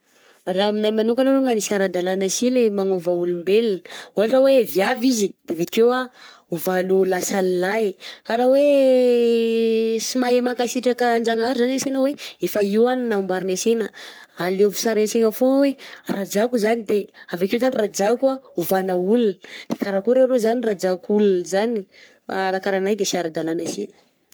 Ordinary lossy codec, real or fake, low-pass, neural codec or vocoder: none; fake; none; codec, 44.1 kHz, 3.4 kbps, Pupu-Codec